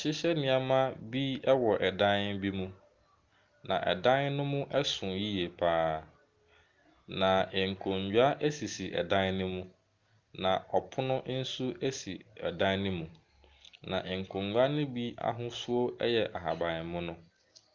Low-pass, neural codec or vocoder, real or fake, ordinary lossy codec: 7.2 kHz; none; real; Opus, 32 kbps